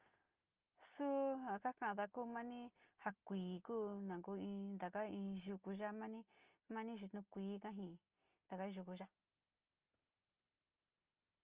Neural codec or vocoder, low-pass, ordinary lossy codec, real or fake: none; 3.6 kHz; Opus, 24 kbps; real